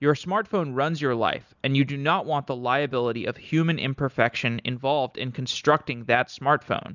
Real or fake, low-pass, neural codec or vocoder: real; 7.2 kHz; none